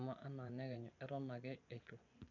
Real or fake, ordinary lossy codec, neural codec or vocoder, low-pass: fake; none; vocoder, 24 kHz, 100 mel bands, Vocos; 7.2 kHz